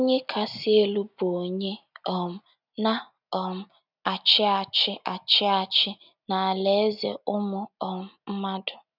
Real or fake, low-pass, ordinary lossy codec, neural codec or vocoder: real; 5.4 kHz; none; none